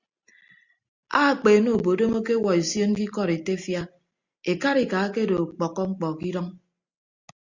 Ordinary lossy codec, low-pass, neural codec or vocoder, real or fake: Opus, 64 kbps; 7.2 kHz; none; real